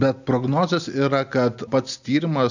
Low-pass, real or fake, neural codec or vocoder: 7.2 kHz; real; none